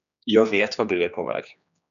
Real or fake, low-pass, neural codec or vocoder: fake; 7.2 kHz; codec, 16 kHz, 2 kbps, X-Codec, HuBERT features, trained on general audio